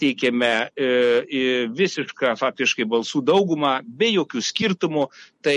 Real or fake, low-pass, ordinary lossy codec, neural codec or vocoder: real; 14.4 kHz; MP3, 48 kbps; none